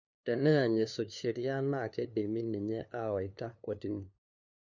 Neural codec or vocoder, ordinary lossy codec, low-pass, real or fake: codec, 16 kHz, 2 kbps, FunCodec, trained on LibriTTS, 25 frames a second; none; 7.2 kHz; fake